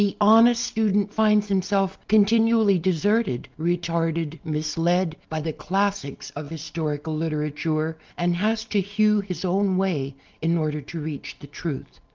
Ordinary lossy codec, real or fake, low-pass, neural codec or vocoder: Opus, 32 kbps; real; 7.2 kHz; none